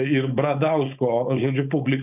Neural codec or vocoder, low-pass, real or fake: codec, 16 kHz, 4.8 kbps, FACodec; 3.6 kHz; fake